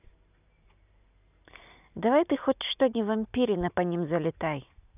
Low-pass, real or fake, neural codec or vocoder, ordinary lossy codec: 3.6 kHz; real; none; none